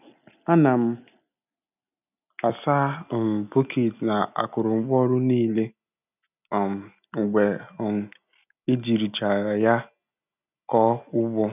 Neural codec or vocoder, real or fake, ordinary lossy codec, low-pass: none; real; none; 3.6 kHz